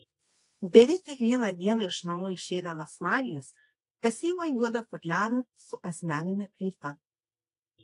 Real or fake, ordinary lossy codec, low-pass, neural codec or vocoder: fake; AAC, 48 kbps; 10.8 kHz; codec, 24 kHz, 0.9 kbps, WavTokenizer, medium music audio release